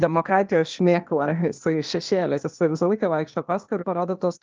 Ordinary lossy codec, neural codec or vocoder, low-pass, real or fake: Opus, 24 kbps; codec, 16 kHz, 0.8 kbps, ZipCodec; 7.2 kHz; fake